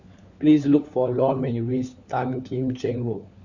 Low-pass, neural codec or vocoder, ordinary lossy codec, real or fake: 7.2 kHz; codec, 16 kHz, 4 kbps, FunCodec, trained on LibriTTS, 50 frames a second; none; fake